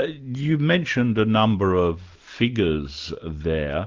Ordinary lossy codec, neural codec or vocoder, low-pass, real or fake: Opus, 32 kbps; none; 7.2 kHz; real